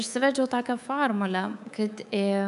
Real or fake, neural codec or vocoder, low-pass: fake; codec, 24 kHz, 3.1 kbps, DualCodec; 10.8 kHz